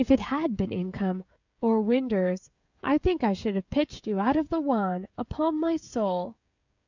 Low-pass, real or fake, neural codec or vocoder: 7.2 kHz; fake; codec, 16 kHz, 16 kbps, FreqCodec, smaller model